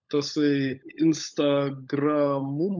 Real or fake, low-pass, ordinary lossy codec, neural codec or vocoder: fake; 7.2 kHz; MP3, 64 kbps; codec, 16 kHz, 16 kbps, FunCodec, trained on LibriTTS, 50 frames a second